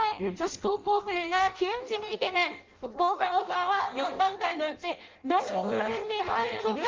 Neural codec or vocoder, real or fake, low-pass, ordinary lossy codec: codec, 16 kHz in and 24 kHz out, 0.6 kbps, FireRedTTS-2 codec; fake; 7.2 kHz; Opus, 32 kbps